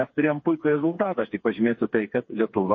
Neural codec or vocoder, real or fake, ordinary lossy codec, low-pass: codec, 16 kHz, 4 kbps, FreqCodec, smaller model; fake; MP3, 32 kbps; 7.2 kHz